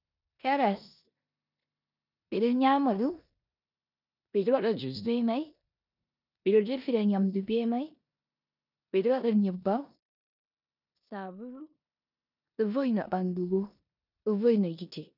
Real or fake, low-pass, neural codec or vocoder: fake; 5.4 kHz; codec, 16 kHz in and 24 kHz out, 0.9 kbps, LongCat-Audio-Codec, four codebook decoder